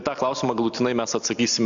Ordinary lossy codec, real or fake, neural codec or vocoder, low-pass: Opus, 64 kbps; real; none; 7.2 kHz